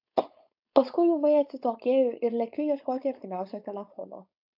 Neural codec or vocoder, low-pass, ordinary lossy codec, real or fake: codec, 16 kHz, 4.8 kbps, FACodec; 5.4 kHz; AAC, 48 kbps; fake